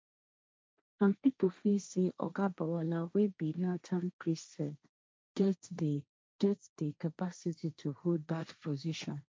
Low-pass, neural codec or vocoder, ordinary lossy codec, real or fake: none; codec, 16 kHz, 1.1 kbps, Voila-Tokenizer; none; fake